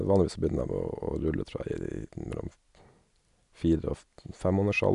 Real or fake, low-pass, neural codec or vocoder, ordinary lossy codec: real; 10.8 kHz; none; none